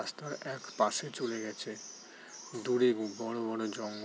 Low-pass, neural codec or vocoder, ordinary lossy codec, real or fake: none; none; none; real